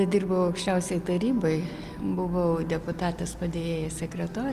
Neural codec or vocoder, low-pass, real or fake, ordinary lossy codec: none; 14.4 kHz; real; Opus, 32 kbps